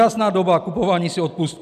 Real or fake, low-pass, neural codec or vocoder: real; 14.4 kHz; none